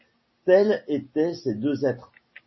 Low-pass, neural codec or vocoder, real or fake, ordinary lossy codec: 7.2 kHz; none; real; MP3, 24 kbps